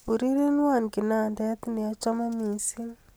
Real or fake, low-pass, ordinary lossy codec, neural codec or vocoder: real; none; none; none